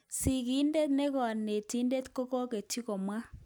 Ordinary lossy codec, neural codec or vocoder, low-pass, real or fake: none; none; none; real